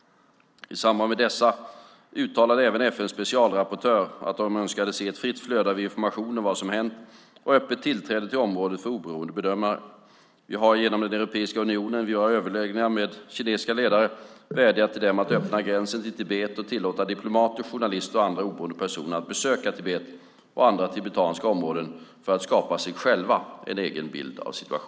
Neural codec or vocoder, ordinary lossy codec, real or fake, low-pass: none; none; real; none